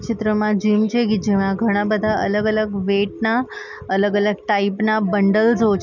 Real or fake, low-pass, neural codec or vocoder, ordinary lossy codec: real; 7.2 kHz; none; none